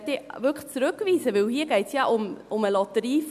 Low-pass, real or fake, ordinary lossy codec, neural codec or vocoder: 14.4 kHz; real; none; none